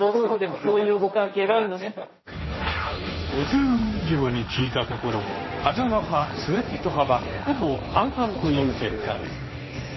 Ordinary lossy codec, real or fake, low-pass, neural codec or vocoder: MP3, 24 kbps; fake; 7.2 kHz; codec, 16 kHz, 1.1 kbps, Voila-Tokenizer